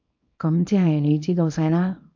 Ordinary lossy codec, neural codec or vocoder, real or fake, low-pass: MP3, 48 kbps; codec, 24 kHz, 0.9 kbps, WavTokenizer, small release; fake; 7.2 kHz